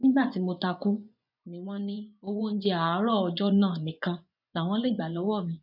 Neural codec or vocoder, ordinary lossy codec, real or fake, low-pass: vocoder, 24 kHz, 100 mel bands, Vocos; none; fake; 5.4 kHz